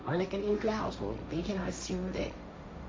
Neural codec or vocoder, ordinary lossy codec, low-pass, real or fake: codec, 16 kHz, 1.1 kbps, Voila-Tokenizer; none; none; fake